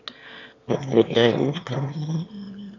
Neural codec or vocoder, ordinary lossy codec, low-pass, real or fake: autoencoder, 22.05 kHz, a latent of 192 numbers a frame, VITS, trained on one speaker; none; 7.2 kHz; fake